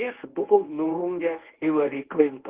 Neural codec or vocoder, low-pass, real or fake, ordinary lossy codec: codec, 24 kHz, 0.9 kbps, WavTokenizer, medium speech release version 1; 3.6 kHz; fake; Opus, 16 kbps